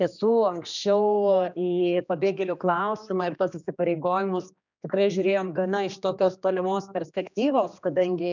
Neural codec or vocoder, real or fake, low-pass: codec, 16 kHz, 2 kbps, X-Codec, HuBERT features, trained on general audio; fake; 7.2 kHz